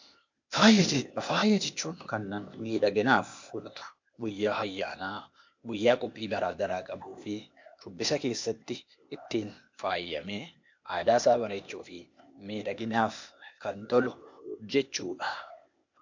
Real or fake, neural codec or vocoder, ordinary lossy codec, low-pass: fake; codec, 16 kHz, 0.8 kbps, ZipCodec; MP3, 48 kbps; 7.2 kHz